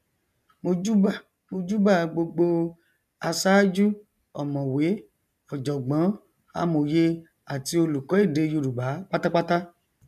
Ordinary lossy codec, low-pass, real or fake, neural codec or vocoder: none; 14.4 kHz; real; none